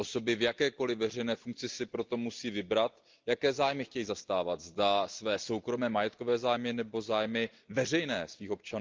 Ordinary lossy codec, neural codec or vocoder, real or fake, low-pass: Opus, 32 kbps; none; real; 7.2 kHz